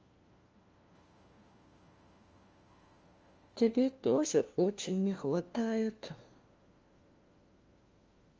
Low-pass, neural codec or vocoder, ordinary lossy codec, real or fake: 7.2 kHz; codec, 16 kHz, 1 kbps, FunCodec, trained on LibriTTS, 50 frames a second; Opus, 24 kbps; fake